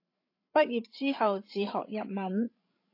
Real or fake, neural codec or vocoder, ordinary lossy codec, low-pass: fake; codec, 16 kHz, 16 kbps, FreqCodec, larger model; AAC, 32 kbps; 5.4 kHz